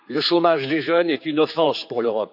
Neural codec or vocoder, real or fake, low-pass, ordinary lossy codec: codec, 16 kHz, 4 kbps, X-Codec, HuBERT features, trained on general audio; fake; 5.4 kHz; none